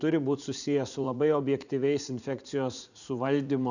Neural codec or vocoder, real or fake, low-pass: vocoder, 44.1 kHz, 80 mel bands, Vocos; fake; 7.2 kHz